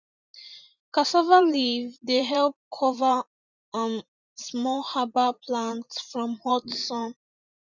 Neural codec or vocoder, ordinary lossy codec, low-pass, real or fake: vocoder, 44.1 kHz, 128 mel bands every 512 samples, BigVGAN v2; none; 7.2 kHz; fake